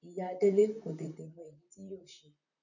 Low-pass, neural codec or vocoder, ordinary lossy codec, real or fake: 7.2 kHz; vocoder, 44.1 kHz, 128 mel bands, Pupu-Vocoder; none; fake